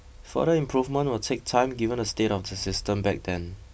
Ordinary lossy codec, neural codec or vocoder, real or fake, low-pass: none; none; real; none